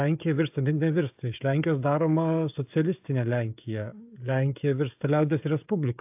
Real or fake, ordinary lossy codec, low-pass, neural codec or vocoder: fake; AAC, 32 kbps; 3.6 kHz; codec, 16 kHz, 16 kbps, FreqCodec, smaller model